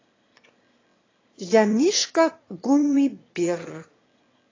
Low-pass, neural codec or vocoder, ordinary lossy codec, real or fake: 7.2 kHz; autoencoder, 22.05 kHz, a latent of 192 numbers a frame, VITS, trained on one speaker; AAC, 32 kbps; fake